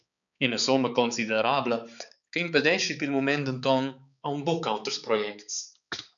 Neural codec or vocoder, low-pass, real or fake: codec, 16 kHz, 4 kbps, X-Codec, HuBERT features, trained on balanced general audio; 7.2 kHz; fake